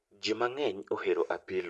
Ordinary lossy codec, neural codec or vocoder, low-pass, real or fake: none; none; none; real